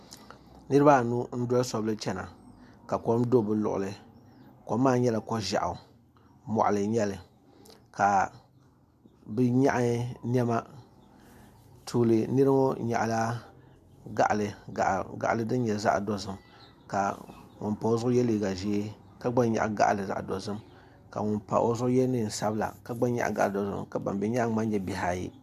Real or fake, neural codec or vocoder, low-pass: real; none; 14.4 kHz